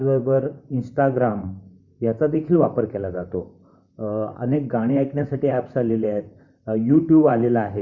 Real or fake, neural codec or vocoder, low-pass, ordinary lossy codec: fake; vocoder, 44.1 kHz, 128 mel bands, Pupu-Vocoder; 7.2 kHz; none